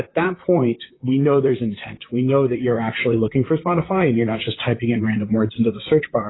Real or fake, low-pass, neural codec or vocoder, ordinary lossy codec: fake; 7.2 kHz; vocoder, 44.1 kHz, 128 mel bands, Pupu-Vocoder; AAC, 16 kbps